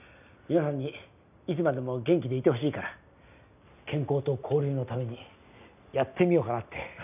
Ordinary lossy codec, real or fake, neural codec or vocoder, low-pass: none; real; none; 3.6 kHz